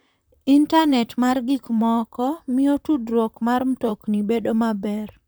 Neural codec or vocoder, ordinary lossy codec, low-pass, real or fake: vocoder, 44.1 kHz, 128 mel bands, Pupu-Vocoder; none; none; fake